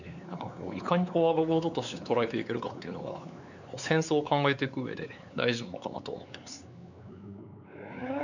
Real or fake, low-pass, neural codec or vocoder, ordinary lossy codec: fake; 7.2 kHz; codec, 16 kHz, 4 kbps, X-Codec, WavLM features, trained on Multilingual LibriSpeech; none